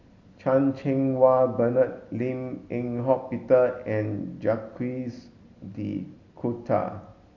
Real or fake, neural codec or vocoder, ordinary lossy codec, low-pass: real; none; MP3, 48 kbps; 7.2 kHz